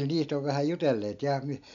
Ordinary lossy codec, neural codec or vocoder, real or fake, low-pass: none; none; real; 7.2 kHz